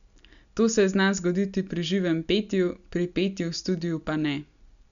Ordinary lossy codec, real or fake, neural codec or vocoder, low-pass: none; real; none; 7.2 kHz